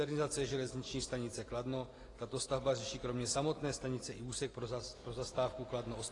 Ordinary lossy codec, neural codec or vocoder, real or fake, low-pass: AAC, 32 kbps; none; real; 10.8 kHz